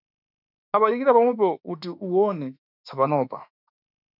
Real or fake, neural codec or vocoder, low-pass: fake; autoencoder, 48 kHz, 32 numbers a frame, DAC-VAE, trained on Japanese speech; 5.4 kHz